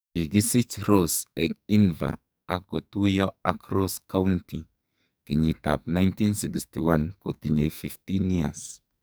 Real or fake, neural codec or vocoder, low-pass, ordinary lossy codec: fake; codec, 44.1 kHz, 2.6 kbps, SNAC; none; none